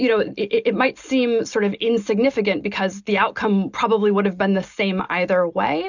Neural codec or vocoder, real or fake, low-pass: none; real; 7.2 kHz